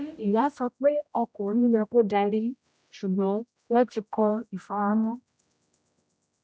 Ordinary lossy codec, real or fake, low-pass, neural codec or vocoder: none; fake; none; codec, 16 kHz, 0.5 kbps, X-Codec, HuBERT features, trained on general audio